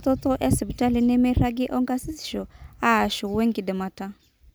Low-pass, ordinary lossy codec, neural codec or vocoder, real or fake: none; none; none; real